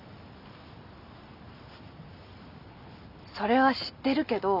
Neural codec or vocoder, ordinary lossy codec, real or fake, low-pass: none; none; real; 5.4 kHz